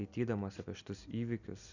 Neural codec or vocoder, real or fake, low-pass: none; real; 7.2 kHz